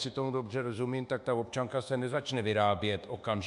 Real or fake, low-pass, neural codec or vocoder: fake; 10.8 kHz; codec, 24 kHz, 1.2 kbps, DualCodec